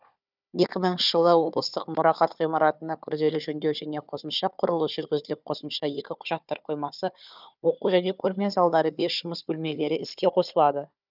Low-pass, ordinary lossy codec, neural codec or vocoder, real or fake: 5.4 kHz; none; codec, 16 kHz, 4 kbps, FunCodec, trained on Chinese and English, 50 frames a second; fake